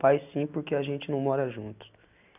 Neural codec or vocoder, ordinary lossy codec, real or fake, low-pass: none; none; real; 3.6 kHz